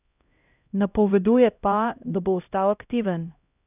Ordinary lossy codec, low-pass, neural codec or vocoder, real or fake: none; 3.6 kHz; codec, 16 kHz, 0.5 kbps, X-Codec, HuBERT features, trained on LibriSpeech; fake